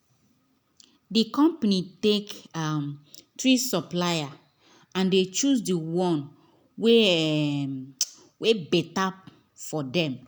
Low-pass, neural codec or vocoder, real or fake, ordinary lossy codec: none; none; real; none